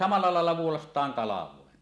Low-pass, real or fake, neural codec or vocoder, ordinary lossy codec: none; real; none; none